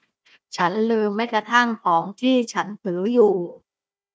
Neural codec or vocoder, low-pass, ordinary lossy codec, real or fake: codec, 16 kHz, 1 kbps, FunCodec, trained on Chinese and English, 50 frames a second; none; none; fake